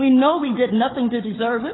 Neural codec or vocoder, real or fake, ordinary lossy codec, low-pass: codec, 16 kHz, 4 kbps, FunCodec, trained on Chinese and English, 50 frames a second; fake; AAC, 16 kbps; 7.2 kHz